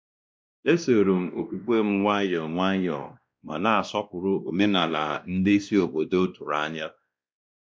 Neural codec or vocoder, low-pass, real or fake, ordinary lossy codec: codec, 16 kHz, 1 kbps, X-Codec, WavLM features, trained on Multilingual LibriSpeech; none; fake; none